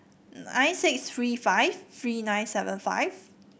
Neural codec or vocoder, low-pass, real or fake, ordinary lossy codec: none; none; real; none